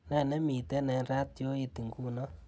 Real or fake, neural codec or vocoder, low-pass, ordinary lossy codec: real; none; none; none